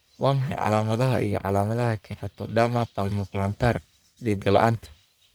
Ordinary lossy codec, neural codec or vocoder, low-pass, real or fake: none; codec, 44.1 kHz, 1.7 kbps, Pupu-Codec; none; fake